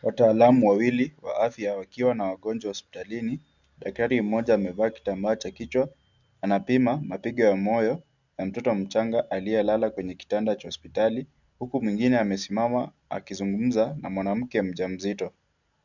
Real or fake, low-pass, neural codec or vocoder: real; 7.2 kHz; none